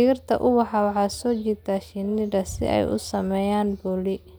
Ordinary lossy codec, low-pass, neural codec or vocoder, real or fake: none; none; none; real